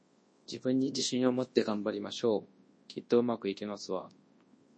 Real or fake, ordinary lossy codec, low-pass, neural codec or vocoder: fake; MP3, 32 kbps; 9.9 kHz; codec, 24 kHz, 0.9 kbps, WavTokenizer, large speech release